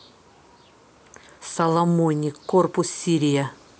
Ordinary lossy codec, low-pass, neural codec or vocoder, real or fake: none; none; none; real